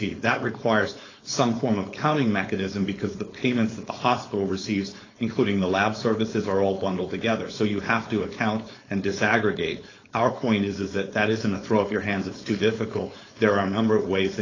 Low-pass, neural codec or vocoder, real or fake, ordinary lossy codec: 7.2 kHz; codec, 16 kHz, 4.8 kbps, FACodec; fake; AAC, 32 kbps